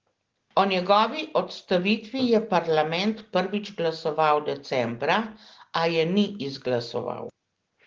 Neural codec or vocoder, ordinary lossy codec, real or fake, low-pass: none; Opus, 16 kbps; real; 7.2 kHz